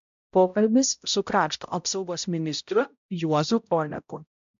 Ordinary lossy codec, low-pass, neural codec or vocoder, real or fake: MP3, 64 kbps; 7.2 kHz; codec, 16 kHz, 0.5 kbps, X-Codec, HuBERT features, trained on balanced general audio; fake